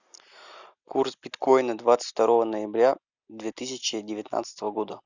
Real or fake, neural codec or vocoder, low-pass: real; none; 7.2 kHz